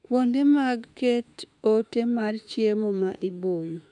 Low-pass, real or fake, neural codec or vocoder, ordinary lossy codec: 10.8 kHz; fake; autoencoder, 48 kHz, 32 numbers a frame, DAC-VAE, trained on Japanese speech; none